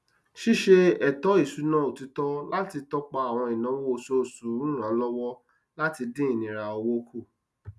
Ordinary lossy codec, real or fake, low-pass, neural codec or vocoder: none; real; none; none